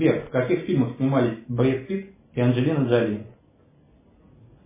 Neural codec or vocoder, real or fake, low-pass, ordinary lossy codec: none; real; 3.6 kHz; MP3, 16 kbps